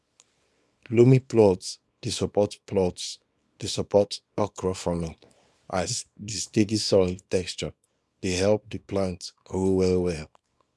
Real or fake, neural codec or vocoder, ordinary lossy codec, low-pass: fake; codec, 24 kHz, 0.9 kbps, WavTokenizer, small release; none; none